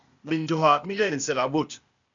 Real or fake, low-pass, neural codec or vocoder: fake; 7.2 kHz; codec, 16 kHz, 0.8 kbps, ZipCodec